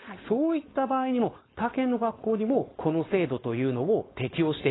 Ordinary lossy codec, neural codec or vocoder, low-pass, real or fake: AAC, 16 kbps; codec, 16 kHz, 4.8 kbps, FACodec; 7.2 kHz; fake